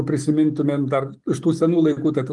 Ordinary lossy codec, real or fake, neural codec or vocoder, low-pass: Opus, 24 kbps; real; none; 10.8 kHz